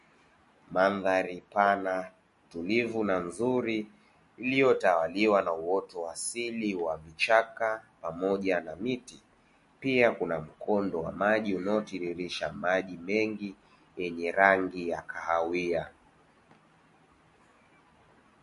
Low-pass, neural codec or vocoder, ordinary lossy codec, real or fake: 14.4 kHz; none; MP3, 48 kbps; real